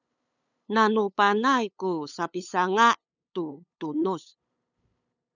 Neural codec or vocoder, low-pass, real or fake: codec, 16 kHz, 8 kbps, FunCodec, trained on LibriTTS, 25 frames a second; 7.2 kHz; fake